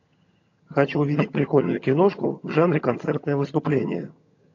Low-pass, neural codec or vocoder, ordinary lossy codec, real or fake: 7.2 kHz; vocoder, 22.05 kHz, 80 mel bands, HiFi-GAN; AAC, 48 kbps; fake